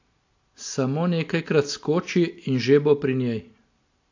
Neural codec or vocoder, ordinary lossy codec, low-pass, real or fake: none; AAC, 48 kbps; 7.2 kHz; real